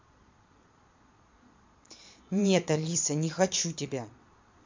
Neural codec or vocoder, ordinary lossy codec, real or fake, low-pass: vocoder, 22.05 kHz, 80 mel bands, Vocos; MP3, 64 kbps; fake; 7.2 kHz